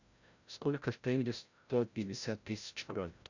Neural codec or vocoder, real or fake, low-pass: codec, 16 kHz, 0.5 kbps, FreqCodec, larger model; fake; 7.2 kHz